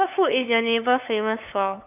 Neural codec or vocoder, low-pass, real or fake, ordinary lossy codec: codec, 16 kHz, 8 kbps, FunCodec, trained on LibriTTS, 25 frames a second; 3.6 kHz; fake; none